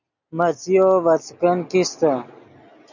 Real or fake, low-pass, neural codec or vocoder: real; 7.2 kHz; none